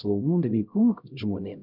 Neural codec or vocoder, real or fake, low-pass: codec, 16 kHz, 0.5 kbps, X-Codec, HuBERT features, trained on LibriSpeech; fake; 5.4 kHz